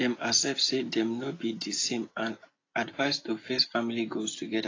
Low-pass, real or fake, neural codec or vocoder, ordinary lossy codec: 7.2 kHz; real; none; AAC, 32 kbps